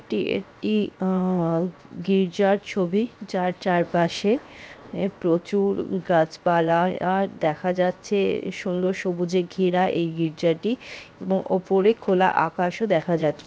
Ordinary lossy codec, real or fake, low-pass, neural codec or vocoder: none; fake; none; codec, 16 kHz, 0.7 kbps, FocalCodec